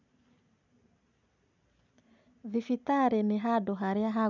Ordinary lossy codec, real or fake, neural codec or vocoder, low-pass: none; real; none; 7.2 kHz